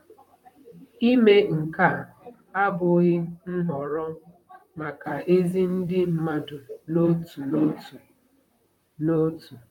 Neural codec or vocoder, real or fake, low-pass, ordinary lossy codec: vocoder, 44.1 kHz, 128 mel bands, Pupu-Vocoder; fake; 19.8 kHz; none